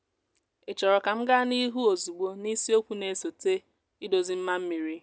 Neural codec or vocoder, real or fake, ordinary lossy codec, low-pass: none; real; none; none